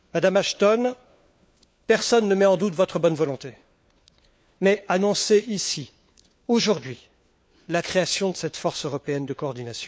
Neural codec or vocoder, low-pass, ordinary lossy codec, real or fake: codec, 16 kHz, 4 kbps, FunCodec, trained on LibriTTS, 50 frames a second; none; none; fake